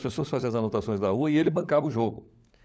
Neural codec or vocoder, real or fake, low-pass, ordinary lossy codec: codec, 16 kHz, 16 kbps, FunCodec, trained on LibriTTS, 50 frames a second; fake; none; none